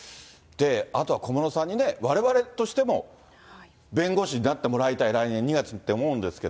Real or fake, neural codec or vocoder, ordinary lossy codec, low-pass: real; none; none; none